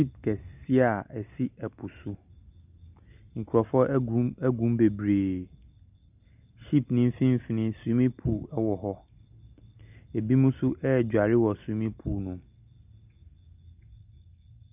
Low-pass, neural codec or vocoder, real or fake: 3.6 kHz; none; real